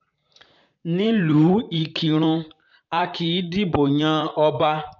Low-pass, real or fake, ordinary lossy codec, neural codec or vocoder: 7.2 kHz; fake; none; vocoder, 44.1 kHz, 128 mel bands, Pupu-Vocoder